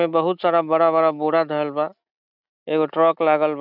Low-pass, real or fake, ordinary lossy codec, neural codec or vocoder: 5.4 kHz; real; none; none